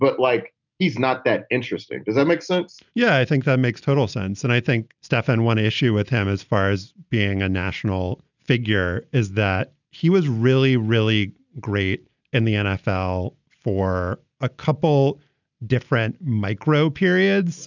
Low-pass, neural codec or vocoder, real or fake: 7.2 kHz; none; real